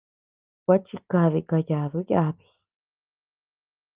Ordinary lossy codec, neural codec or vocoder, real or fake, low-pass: Opus, 32 kbps; none; real; 3.6 kHz